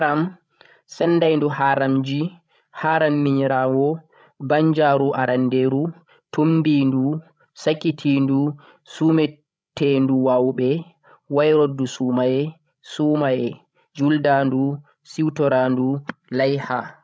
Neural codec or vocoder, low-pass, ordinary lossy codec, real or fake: codec, 16 kHz, 16 kbps, FreqCodec, larger model; none; none; fake